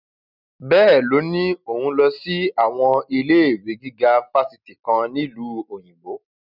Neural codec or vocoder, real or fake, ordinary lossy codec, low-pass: none; real; none; 5.4 kHz